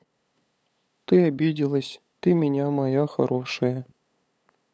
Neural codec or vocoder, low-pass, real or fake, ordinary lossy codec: codec, 16 kHz, 8 kbps, FunCodec, trained on LibriTTS, 25 frames a second; none; fake; none